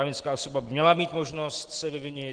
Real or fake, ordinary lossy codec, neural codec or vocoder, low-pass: real; Opus, 16 kbps; none; 9.9 kHz